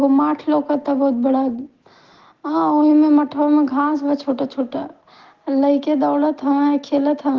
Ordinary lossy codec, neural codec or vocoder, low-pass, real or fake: Opus, 16 kbps; none; 7.2 kHz; real